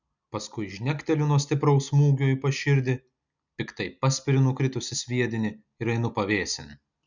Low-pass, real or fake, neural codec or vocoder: 7.2 kHz; real; none